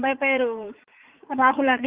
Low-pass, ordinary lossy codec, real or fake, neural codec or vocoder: 3.6 kHz; Opus, 32 kbps; fake; vocoder, 44.1 kHz, 80 mel bands, Vocos